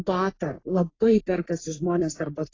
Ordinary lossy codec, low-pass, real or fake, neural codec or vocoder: AAC, 32 kbps; 7.2 kHz; fake; codec, 44.1 kHz, 3.4 kbps, Pupu-Codec